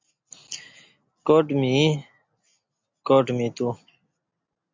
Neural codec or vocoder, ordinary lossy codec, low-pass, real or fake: none; MP3, 64 kbps; 7.2 kHz; real